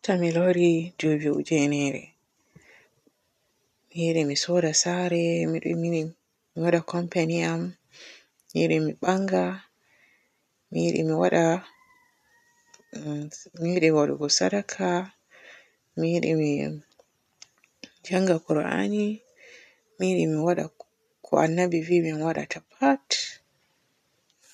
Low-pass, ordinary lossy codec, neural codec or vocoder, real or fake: 14.4 kHz; none; none; real